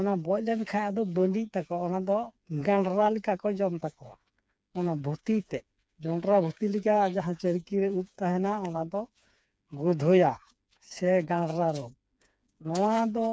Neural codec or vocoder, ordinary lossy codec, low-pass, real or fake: codec, 16 kHz, 4 kbps, FreqCodec, smaller model; none; none; fake